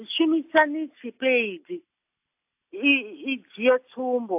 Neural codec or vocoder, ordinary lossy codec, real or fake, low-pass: none; AAC, 32 kbps; real; 3.6 kHz